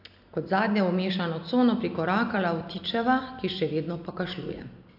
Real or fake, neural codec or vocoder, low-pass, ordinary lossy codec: real; none; 5.4 kHz; AAC, 48 kbps